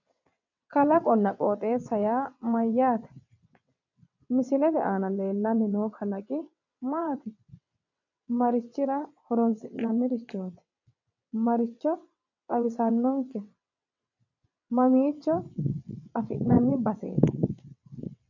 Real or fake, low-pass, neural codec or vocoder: fake; 7.2 kHz; vocoder, 22.05 kHz, 80 mel bands, WaveNeXt